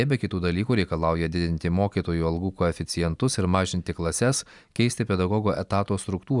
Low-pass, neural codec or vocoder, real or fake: 10.8 kHz; none; real